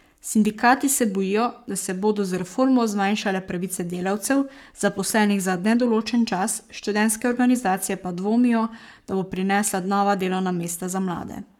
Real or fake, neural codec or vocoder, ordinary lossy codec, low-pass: fake; codec, 44.1 kHz, 7.8 kbps, Pupu-Codec; none; 19.8 kHz